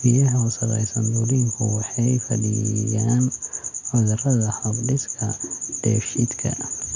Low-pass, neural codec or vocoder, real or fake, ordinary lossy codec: 7.2 kHz; none; real; none